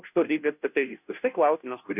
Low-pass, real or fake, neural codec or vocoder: 3.6 kHz; fake; codec, 16 kHz, 0.5 kbps, FunCodec, trained on Chinese and English, 25 frames a second